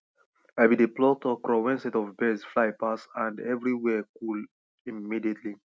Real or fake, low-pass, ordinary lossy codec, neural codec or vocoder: real; none; none; none